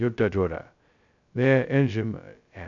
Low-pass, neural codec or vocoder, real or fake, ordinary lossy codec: 7.2 kHz; codec, 16 kHz, 0.2 kbps, FocalCodec; fake; none